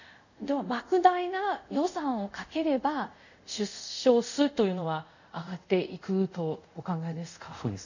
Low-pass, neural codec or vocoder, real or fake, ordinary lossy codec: 7.2 kHz; codec, 24 kHz, 0.5 kbps, DualCodec; fake; none